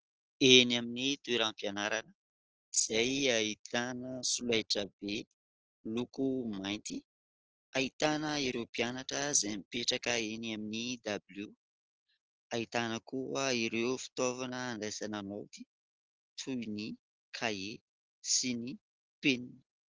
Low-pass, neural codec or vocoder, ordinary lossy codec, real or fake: 7.2 kHz; none; Opus, 16 kbps; real